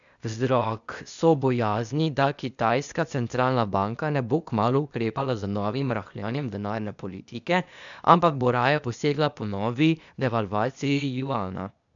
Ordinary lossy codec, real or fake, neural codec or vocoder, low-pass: none; fake; codec, 16 kHz, 0.8 kbps, ZipCodec; 7.2 kHz